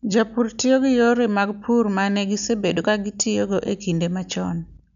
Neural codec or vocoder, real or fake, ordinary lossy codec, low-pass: none; real; none; 7.2 kHz